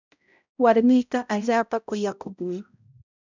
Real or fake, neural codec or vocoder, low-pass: fake; codec, 16 kHz, 0.5 kbps, X-Codec, HuBERT features, trained on balanced general audio; 7.2 kHz